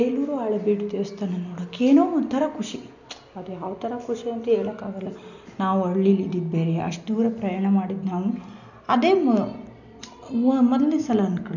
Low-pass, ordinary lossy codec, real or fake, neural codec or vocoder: 7.2 kHz; none; real; none